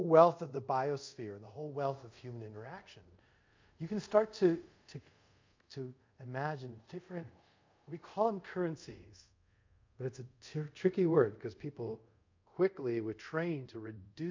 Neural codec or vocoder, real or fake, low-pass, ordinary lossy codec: codec, 24 kHz, 0.5 kbps, DualCodec; fake; 7.2 kHz; MP3, 64 kbps